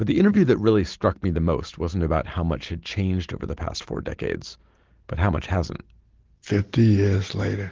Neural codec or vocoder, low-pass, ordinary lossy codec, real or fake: none; 7.2 kHz; Opus, 16 kbps; real